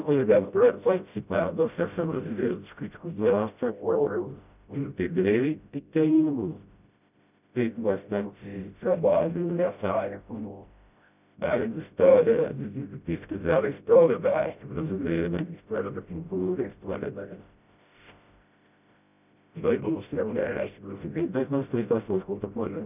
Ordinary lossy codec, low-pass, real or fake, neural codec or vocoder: none; 3.6 kHz; fake; codec, 16 kHz, 0.5 kbps, FreqCodec, smaller model